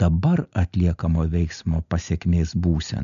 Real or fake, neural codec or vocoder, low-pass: real; none; 7.2 kHz